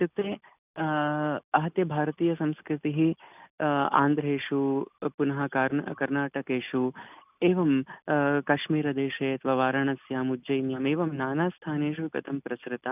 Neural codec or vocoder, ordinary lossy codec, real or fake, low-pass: none; none; real; 3.6 kHz